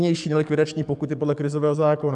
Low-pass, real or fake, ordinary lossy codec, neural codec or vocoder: 10.8 kHz; fake; MP3, 96 kbps; codec, 44.1 kHz, 7.8 kbps, DAC